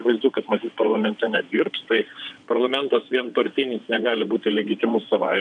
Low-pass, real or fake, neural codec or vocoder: 9.9 kHz; fake; vocoder, 22.05 kHz, 80 mel bands, WaveNeXt